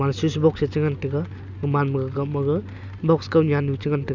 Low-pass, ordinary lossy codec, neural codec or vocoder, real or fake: 7.2 kHz; none; none; real